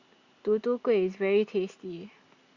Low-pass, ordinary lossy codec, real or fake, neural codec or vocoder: 7.2 kHz; Opus, 64 kbps; real; none